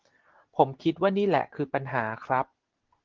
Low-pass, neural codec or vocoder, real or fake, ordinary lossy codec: 7.2 kHz; none; real; Opus, 16 kbps